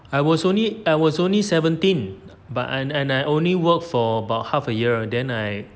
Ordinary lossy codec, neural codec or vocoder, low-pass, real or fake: none; none; none; real